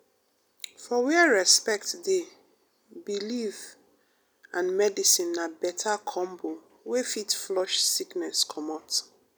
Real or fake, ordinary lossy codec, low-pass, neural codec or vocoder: real; none; none; none